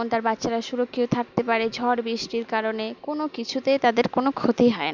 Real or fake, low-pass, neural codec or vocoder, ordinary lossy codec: real; 7.2 kHz; none; none